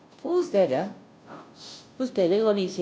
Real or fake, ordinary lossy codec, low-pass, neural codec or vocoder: fake; none; none; codec, 16 kHz, 0.5 kbps, FunCodec, trained on Chinese and English, 25 frames a second